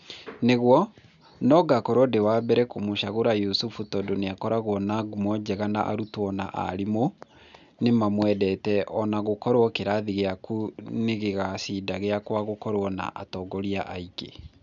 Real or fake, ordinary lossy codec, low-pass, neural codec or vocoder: real; none; 7.2 kHz; none